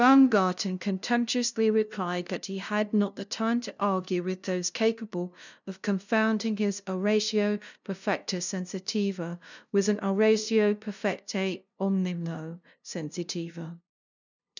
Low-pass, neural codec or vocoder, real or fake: 7.2 kHz; codec, 16 kHz, 0.5 kbps, FunCodec, trained on LibriTTS, 25 frames a second; fake